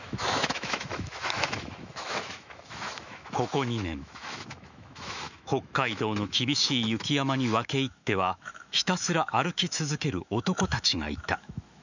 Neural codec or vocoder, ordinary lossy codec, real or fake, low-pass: autoencoder, 48 kHz, 128 numbers a frame, DAC-VAE, trained on Japanese speech; none; fake; 7.2 kHz